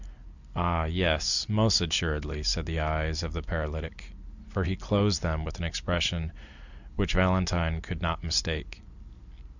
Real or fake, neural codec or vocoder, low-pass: real; none; 7.2 kHz